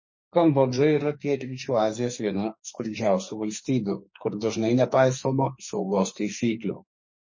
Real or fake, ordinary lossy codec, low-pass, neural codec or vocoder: fake; MP3, 32 kbps; 7.2 kHz; codec, 44.1 kHz, 2.6 kbps, SNAC